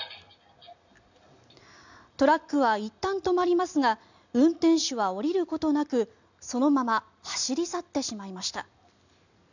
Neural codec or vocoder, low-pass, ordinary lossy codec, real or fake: none; 7.2 kHz; none; real